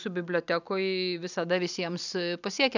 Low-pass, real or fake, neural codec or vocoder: 7.2 kHz; fake; vocoder, 44.1 kHz, 128 mel bands every 256 samples, BigVGAN v2